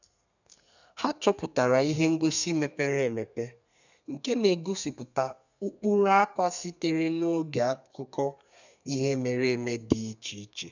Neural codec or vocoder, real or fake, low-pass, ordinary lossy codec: codec, 32 kHz, 1.9 kbps, SNAC; fake; 7.2 kHz; none